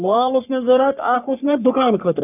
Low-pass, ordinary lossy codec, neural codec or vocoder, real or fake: 3.6 kHz; none; codec, 44.1 kHz, 3.4 kbps, Pupu-Codec; fake